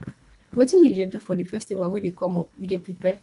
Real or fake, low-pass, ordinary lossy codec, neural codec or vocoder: fake; 10.8 kHz; AAC, 64 kbps; codec, 24 kHz, 1.5 kbps, HILCodec